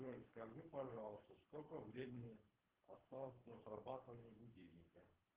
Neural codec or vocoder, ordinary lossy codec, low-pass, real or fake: codec, 24 kHz, 3 kbps, HILCodec; Opus, 16 kbps; 3.6 kHz; fake